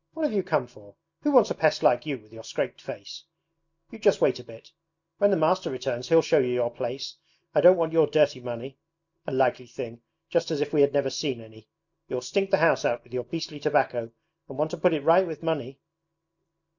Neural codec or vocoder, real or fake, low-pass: none; real; 7.2 kHz